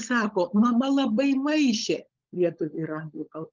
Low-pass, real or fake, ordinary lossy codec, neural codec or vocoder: 7.2 kHz; fake; Opus, 32 kbps; codec, 16 kHz, 16 kbps, FunCodec, trained on LibriTTS, 50 frames a second